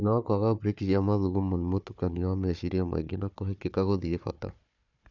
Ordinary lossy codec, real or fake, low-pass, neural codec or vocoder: none; fake; 7.2 kHz; codec, 16 kHz, 4 kbps, FunCodec, trained on Chinese and English, 50 frames a second